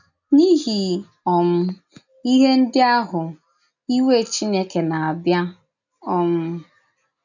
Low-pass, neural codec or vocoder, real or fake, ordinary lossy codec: 7.2 kHz; none; real; none